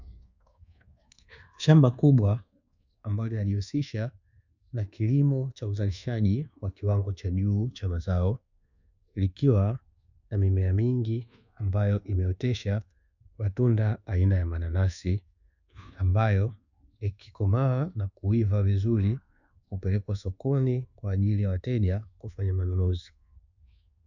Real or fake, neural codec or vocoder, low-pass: fake; codec, 24 kHz, 1.2 kbps, DualCodec; 7.2 kHz